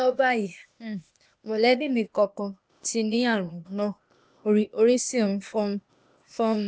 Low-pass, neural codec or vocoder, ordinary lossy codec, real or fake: none; codec, 16 kHz, 0.8 kbps, ZipCodec; none; fake